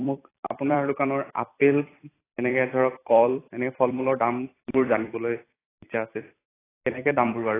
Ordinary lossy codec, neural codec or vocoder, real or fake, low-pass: AAC, 16 kbps; vocoder, 44.1 kHz, 128 mel bands every 256 samples, BigVGAN v2; fake; 3.6 kHz